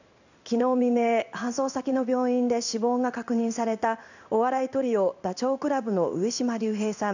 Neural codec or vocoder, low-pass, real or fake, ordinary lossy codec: codec, 16 kHz in and 24 kHz out, 1 kbps, XY-Tokenizer; 7.2 kHz; fake; none